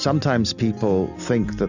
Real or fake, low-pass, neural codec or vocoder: real; 7.2 kHz; none